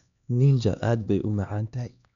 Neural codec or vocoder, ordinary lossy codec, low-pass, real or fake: codec, 16 kHz, 2 kbps, X-Codec, HuBERT features, trained on LibriSpeech; none; 7.2 kHz; fake